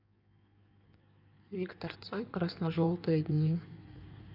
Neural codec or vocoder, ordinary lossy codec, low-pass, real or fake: codec, 16 kHz in and 24 kHz out, 1.1 kbps, FireRedTTS-2 codec; none; 5.4 kHz; fake